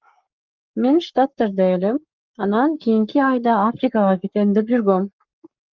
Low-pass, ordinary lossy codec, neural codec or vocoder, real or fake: 7.2 kHz; Opus, 32 kbps; codec, 44.1 kHz, 7.8 kbps, Pupu-Codec; fake